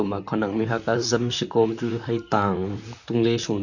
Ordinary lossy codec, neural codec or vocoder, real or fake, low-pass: none; vocoder, 44.1 kHz, 128 mel bands, Pupu-Vocoder; fake; 7.2 kHz